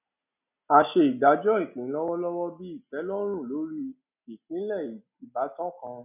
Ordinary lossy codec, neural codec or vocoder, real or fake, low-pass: none; none; real; 3.6 kHz